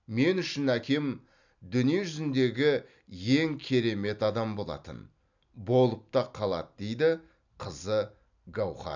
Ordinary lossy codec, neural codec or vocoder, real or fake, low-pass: none; none; real; 7.2 kHz